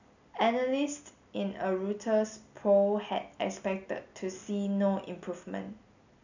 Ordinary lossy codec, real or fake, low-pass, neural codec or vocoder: none; real; 7.2 kHz; none